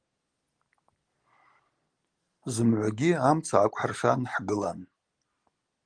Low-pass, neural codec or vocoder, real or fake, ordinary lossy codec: 9.9 kHz; none; real; Opus, 24 kbps